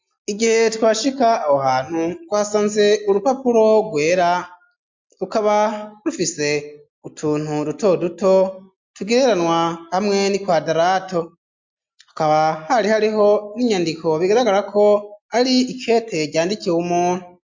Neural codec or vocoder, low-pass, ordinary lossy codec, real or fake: none; 7.2 kHz; MP3, 64 kbps; real